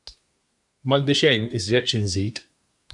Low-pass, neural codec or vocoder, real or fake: 10.8 kHz; codec, 24 kHz, 1 kbps, SNAC; fake